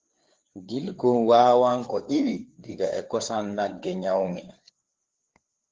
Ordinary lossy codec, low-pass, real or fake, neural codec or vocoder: Opus, 16 kbps; 7.2 kHz; fake; codec, 16 kHz, 4 kbps, FreqCodec, larger model